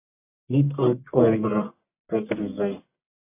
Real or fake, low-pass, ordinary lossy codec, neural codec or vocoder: fake; 3.6 kHz; AAC, 32 kbps; codec, 44.1 kHz, 1.7 kbps, Pupu-Codec